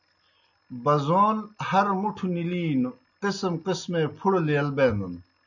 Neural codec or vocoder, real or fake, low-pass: none; real; 7.2 kHz